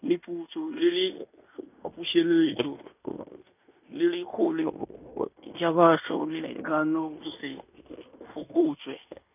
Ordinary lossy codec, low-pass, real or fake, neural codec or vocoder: none; 3.6 kHz; fake; codec, 16 kHz in and 24 kHz out, 0.9 kbps, LongCat-Audio-Codec, fine tuned four codebook decoder